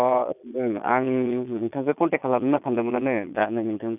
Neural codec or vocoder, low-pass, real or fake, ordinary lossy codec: vocoder, 22.05 kHz, 80 mel bands, Vocos; 3.6 kHz; fake; none